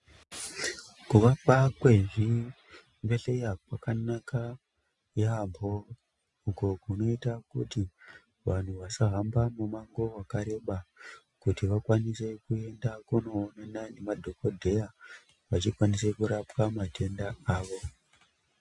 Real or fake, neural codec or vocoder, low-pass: real; none; 10.8 kHz